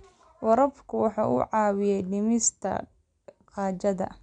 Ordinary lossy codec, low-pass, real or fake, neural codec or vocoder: MP3, 96 kbps; 9.9 kHz; real; none